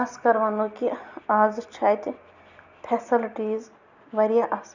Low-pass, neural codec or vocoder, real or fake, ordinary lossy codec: 7.2 kHz; none; real; none